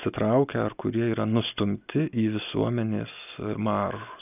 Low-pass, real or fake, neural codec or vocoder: 3.6 kHz; real; none